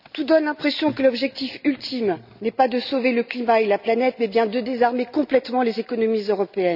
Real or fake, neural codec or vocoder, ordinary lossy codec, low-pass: real; none; none; 5.4 kHz